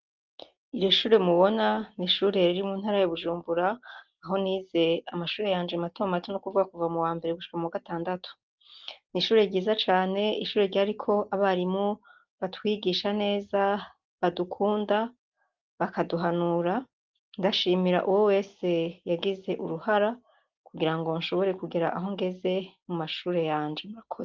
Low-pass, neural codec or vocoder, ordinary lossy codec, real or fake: 7.2 kHz; none; Opus, 32 kbps; real